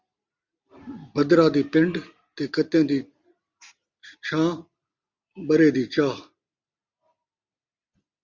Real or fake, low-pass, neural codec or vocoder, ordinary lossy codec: real; 7.2 kHz; none; Opus, 64 kbps